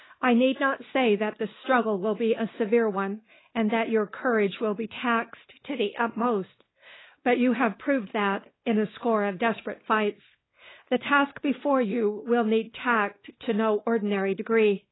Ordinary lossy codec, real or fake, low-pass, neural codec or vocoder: AAC, 16 kbps; fake; 7.2 kHz; codec, 16 kHz, 2 kbps, X-Codec, WavLM features, trained on Multilingual LibriSpeech